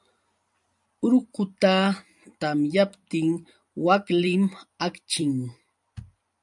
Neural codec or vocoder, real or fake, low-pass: vocoder, 44.1 kHz, 128 mel bands every 256 samples, BigVGAN v2; fake; 10.8 kHz